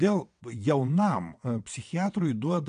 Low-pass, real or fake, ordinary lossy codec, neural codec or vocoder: 9.9 kHz; fake; AAC, 64 kbps; vocoder, 22.05 kHz, 80 mel bands, WaveNeXt